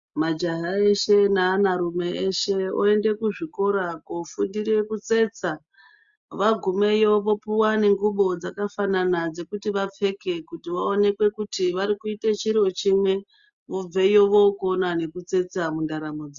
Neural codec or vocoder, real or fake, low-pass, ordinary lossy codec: none; real; 7.2 kHz; Opus, 64 kbps